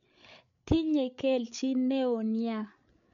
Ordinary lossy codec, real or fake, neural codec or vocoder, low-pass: none; fake; codec, 16 kHz, 8 kbps, FreqCodec, larger model; 7.2 kHz